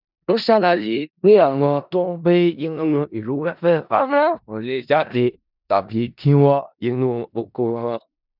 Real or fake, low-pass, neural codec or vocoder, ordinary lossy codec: fake; 5.4 kHz; codec, 16 kHz in and 24 kHz out, 0.4 kbps, LongCat-Audio-Codec, four codebook decoder; none